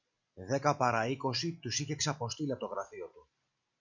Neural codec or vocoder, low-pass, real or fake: none; 7.2 kHz; real